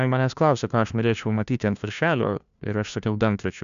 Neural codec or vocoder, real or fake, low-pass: codec, 16 kHz, 1 kbps, FunCodec, trained on LibriTTS, 50 frames a second; fake; 7.2 kHz